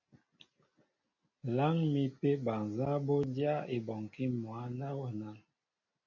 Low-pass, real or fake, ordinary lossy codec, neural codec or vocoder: 7.2 kHz; real; MP3, 32 kbps; none